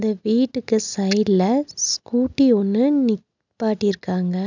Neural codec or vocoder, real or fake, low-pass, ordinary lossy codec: none; real; 7.2 kHz; none